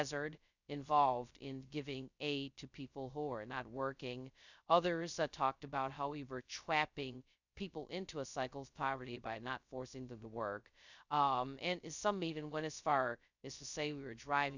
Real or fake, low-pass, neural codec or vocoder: fake; 7.2 kHz; codec, 16 kHz, 0.2 kbps, FocalCodec